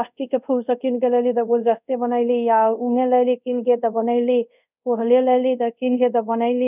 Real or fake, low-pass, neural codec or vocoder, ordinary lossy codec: fake; 3.6 kHz; codec, 24 kHz, 0.5 kbps, DualCodec; none